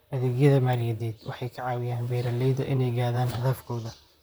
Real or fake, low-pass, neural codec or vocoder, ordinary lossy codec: fake; none; vocoder, 44.1 kHz, 128 mel bands, Pupu-Vocoder; none